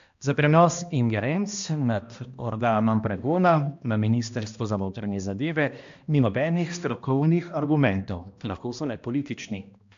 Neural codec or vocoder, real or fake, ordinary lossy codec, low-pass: codec, 16 kHz, 1 kbps, X-Codec, HuBERT features, trained on balanced general audio; fake; AAC, 64 kbps; 7.2 kHz